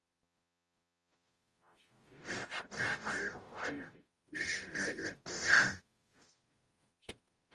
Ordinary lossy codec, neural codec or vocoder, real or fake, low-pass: Opus, 32 kbps; codec, 44.1 kHz, 0.9 kbps, DAC; fake; 10.8 kHz